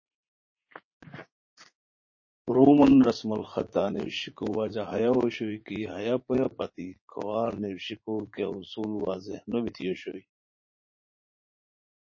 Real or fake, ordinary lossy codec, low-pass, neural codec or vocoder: fake; MP3, 32 kbps; 7.2 kHz; vocoder, 44.1 kHz, 80 mel bands, Vocos